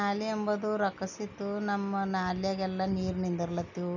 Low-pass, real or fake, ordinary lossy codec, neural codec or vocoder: 7.2 kHz; real; none; none